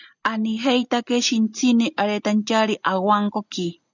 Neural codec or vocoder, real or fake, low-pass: none; real; 7.2 kHz